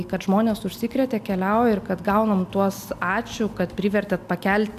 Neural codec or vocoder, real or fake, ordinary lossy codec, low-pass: none; real; AAC, 96 kbps; 14.4 kHz